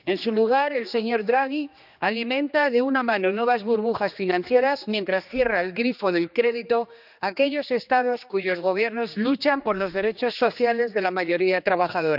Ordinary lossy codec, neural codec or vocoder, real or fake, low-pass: none; codec, 16 kHz, 2 kbps, X-Codec, HuBERT features, trained on general audio; fake; 5.4 kHz